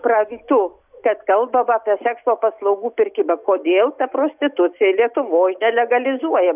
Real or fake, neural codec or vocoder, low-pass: real; none; 3.6 kHz